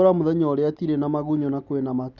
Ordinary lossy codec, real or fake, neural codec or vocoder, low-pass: none; real; none; 7.2 kHz